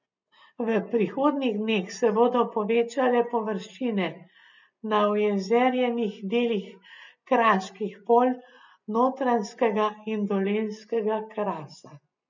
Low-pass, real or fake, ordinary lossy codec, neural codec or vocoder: 7.2 kHz; real; none; none